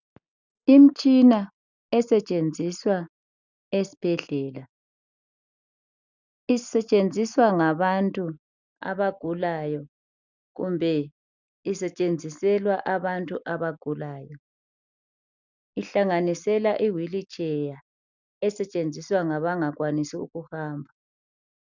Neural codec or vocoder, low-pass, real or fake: none; 7.2 kHz; real